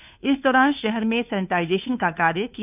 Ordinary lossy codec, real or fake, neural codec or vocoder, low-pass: none; fake; codec, 16 kHz, 2 kbps, FunCodec, trained on Chinese and English, 25 frames a second; 3.6 kHz